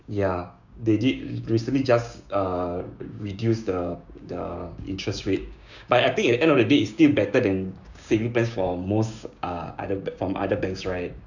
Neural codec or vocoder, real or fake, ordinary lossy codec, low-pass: codec, 16 kHz, 6 kbps, DAC; fake; none; 7.2 kHz